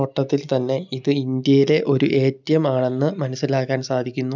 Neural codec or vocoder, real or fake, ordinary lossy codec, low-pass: none; real; none; 7.2 kHz